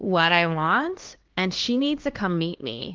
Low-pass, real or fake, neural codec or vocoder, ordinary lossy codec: 7.2 kHz; fake; codec, 16 kHz, 2 kbps, X-Codec, HuBERT features, trained on LibriSpeech; Opus, 16 kbps